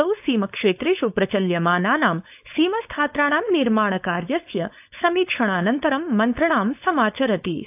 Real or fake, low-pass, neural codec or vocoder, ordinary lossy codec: fake; 3.6 kHz; codec, 16 kHz, 4.8 kbps, FACodec; AAC, 32 kbps